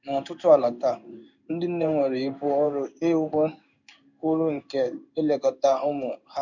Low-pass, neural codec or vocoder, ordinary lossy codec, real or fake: 7.2 kHz; codec, 16 kHz in and 24 kHz out, 1 kbps, XY-Tokenizer; none; fake